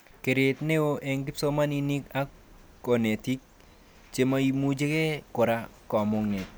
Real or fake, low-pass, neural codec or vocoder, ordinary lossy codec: real; none; none; none